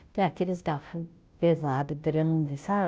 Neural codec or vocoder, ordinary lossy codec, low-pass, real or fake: codec, 16 kHz, 0.5 kbps, FunCodec, trained on Chinese and English, 25 frames a second; none; none; fake